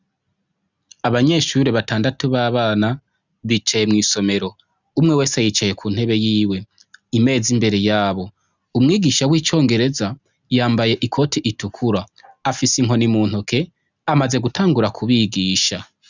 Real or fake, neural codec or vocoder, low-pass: real; none; 7.2 kHz